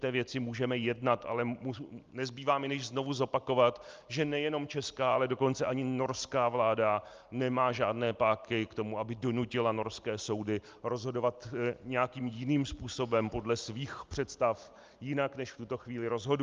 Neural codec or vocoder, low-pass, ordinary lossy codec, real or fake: none; 7.2 kHz; Opus, 32 kbps; real